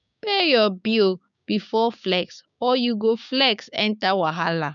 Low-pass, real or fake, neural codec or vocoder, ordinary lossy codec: 7.2 kHz; fake; codec, 16 kHz, 6 kbps, DAC; none